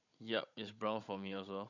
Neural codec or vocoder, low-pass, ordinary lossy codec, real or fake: codec, 16 kHz, 16 kbps, FunCodec, trained on Chinese and English, 50 frames a second; 7.2 kHz; none; fake